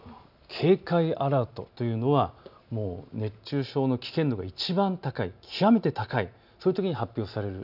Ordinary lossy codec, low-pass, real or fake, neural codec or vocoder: none; 5.4 kHz; real; none